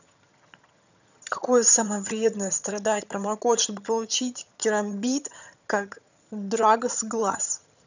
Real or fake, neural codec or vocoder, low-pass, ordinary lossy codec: fake; vocoder, 22.05 kHz, 80 mel bands, HiFi-GAN; 7.2 kHz; none